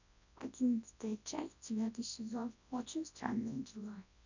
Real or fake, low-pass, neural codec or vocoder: fake; 7.2 kHz; codec, 24 kHz, 0.9 kbps, WavTokenizer, large speech release